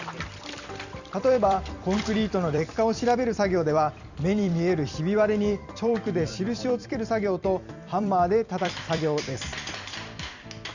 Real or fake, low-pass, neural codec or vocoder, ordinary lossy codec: fake; 7.2 kHz; vocoder, 44.1 kHz, 128 mel bands every 256 samples, BigVGAN v2; none